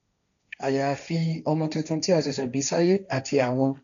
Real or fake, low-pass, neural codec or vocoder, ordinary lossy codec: fake; 7.2 kHz; codec, 16 kHz, 1.1 kbps, Voila-Tokenizer; none